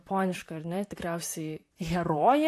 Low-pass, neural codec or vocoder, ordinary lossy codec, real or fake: 14.4 kHz; vocoder, 44.1 kHz, 128 mel bands, Pupu-Vocoder; AAC, 64 kbps; fake